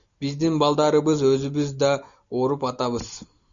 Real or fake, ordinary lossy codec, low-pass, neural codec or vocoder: real; MP3, 64 kbps; 7.2 kHz; none